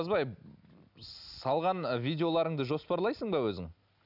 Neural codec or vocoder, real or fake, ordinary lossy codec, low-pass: none; real; none; 5.4 kHz